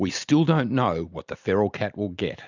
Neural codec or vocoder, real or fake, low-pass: none; real; 7.2 kHz